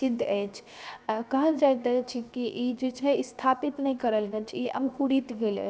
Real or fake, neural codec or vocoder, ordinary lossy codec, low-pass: fake; codec, 16 kHz, 0.7 kbps, FocalCodec; none; none